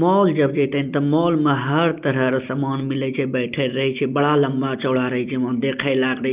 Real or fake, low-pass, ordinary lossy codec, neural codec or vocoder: real; 3.6 kHz; Opus, 32 kbps; none